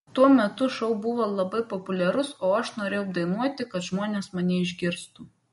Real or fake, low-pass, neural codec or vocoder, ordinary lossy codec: real; 19.8 kHz; none; MP3, 48 kbps